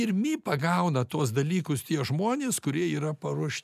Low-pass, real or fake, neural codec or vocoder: 14.4 kHz; real; none